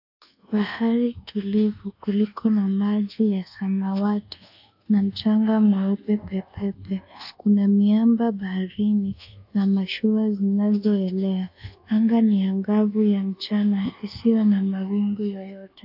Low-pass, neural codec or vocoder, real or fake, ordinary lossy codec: 5.4 kHz; codec, 24 kHz, 1.2 kbps, DualCodec; fake; AAC, 48 kbps